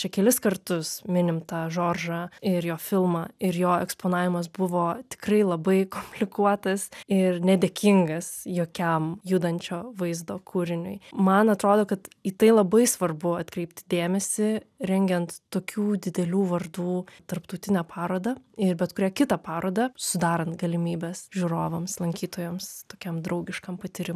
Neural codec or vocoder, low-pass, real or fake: none; 14.4 kHz; real